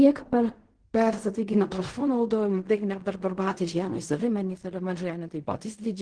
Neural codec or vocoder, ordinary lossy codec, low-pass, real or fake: codec, 16 kHz in and 24 kHz out, 0.4 kbps, LongCat-Audio-Codec, fine tuned four codebook decoder; Opus, 16 kbps; 9.9 kHz; fake